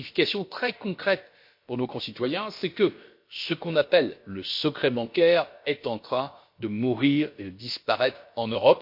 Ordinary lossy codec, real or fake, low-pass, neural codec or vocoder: MP3, 32 kbps; fake; 5.4 kHz; codec, 16 kHz, about 1 kbps, DyCAST, with the encoder's durations